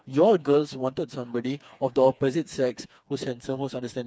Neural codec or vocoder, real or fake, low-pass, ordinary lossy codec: codec, 16 kHz, 4 kbps, FreqCodec, smaller model; fake; none; none